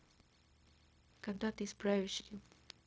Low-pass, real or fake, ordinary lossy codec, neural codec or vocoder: none; fake; none; codec, 16 kHz, 0.4 kbps, LongCat-Audio-Codec